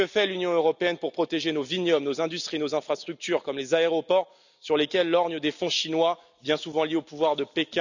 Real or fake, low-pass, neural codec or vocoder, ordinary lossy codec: real; 7.2 kHz; none; none